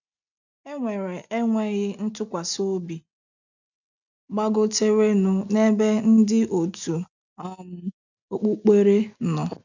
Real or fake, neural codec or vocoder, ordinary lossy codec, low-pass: real; none; none; 7.2 kHz